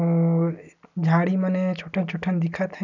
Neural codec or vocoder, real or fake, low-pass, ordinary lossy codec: none; real; 7.2 kHz; none